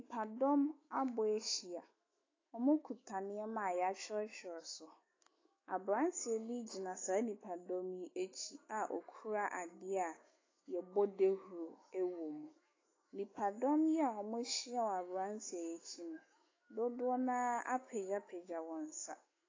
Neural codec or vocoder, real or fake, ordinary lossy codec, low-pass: autoencoder, 48 kHz, 128 numbers a frame, DAC-VAE, trained on Japanese speech; fake; AAC, 32 kbps; 7.2 kHz